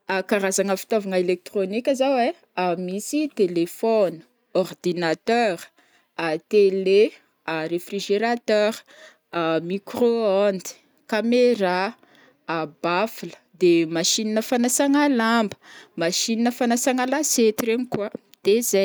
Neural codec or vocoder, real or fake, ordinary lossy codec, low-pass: none; real; none; none